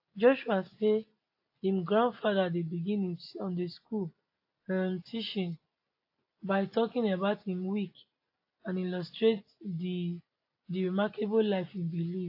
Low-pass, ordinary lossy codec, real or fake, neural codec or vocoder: 5.4 kHz; AAC, 32 kbps; real; none